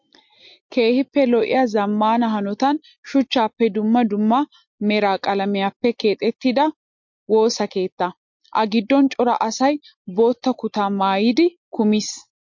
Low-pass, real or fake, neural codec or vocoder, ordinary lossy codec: 7.2 kHz; real; none; MP3, 48 kbps